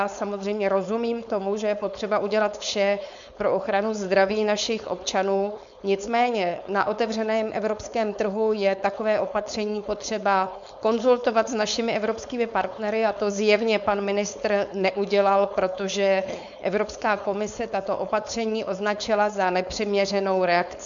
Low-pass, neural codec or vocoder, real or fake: 7.2 kHz; codec, 16 kHz, 4.8 kbps, FACodec; fake